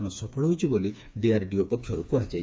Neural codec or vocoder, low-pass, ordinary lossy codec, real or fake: codec, 16 kHz, 4 kbps, FreqCodec, smaller model; none; none; fake